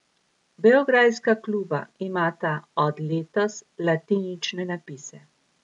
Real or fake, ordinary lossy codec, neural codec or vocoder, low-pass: real; none; none; 10.8 kHz